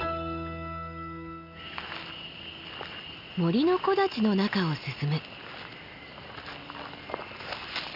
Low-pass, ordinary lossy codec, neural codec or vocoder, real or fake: 5.4 kHz; none; none; real